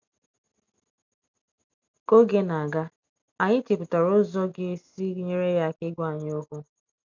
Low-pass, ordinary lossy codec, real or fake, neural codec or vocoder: 7.2 kHz; none; real; none